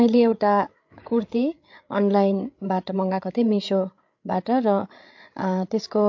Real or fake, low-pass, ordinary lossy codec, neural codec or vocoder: fake; 7.2 kHz; MP3, 48 kbps; codec, 16 kHz, 8 kbps, FreqCodec, larger model